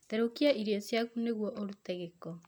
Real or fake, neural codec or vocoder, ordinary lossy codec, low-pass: real; none; none; none